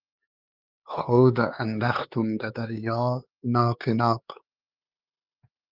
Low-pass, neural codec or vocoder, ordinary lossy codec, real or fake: 5.4 kHz; codec, 16 kHz, 4 kbps, X-Codec, HuBERT features, trained on LibriSpeech; Opus, 24 kbps; fake